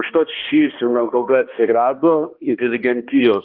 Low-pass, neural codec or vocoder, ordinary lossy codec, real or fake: 5.4 kHz; codec, 16 kHz, 1 kbps, X-Codec, HuBERT features, trained on balanced general audio; Opus, 32 kbps; fake